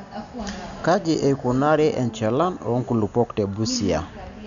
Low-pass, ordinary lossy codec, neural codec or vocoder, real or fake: 7.2 kHz; none; none; real